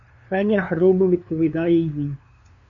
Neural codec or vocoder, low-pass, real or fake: codec, 16 kHz, 2 kbps, FunCodec, trained on LibriTTS, 25 frames a second; 7.2 kHz; fake